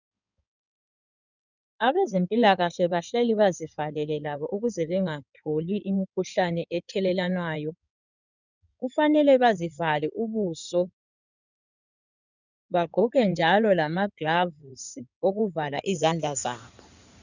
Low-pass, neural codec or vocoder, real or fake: 7.2 kHz; codec, 16 kHz in and 24 kHz out, 2.2 kbps, FireRedTTS-2 codec; fake